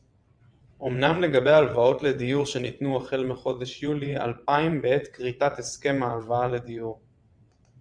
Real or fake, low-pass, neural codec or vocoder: fake; 9.9 kHz; vocoder, 22.05 kHz, 80 mel bands, WaveNeXt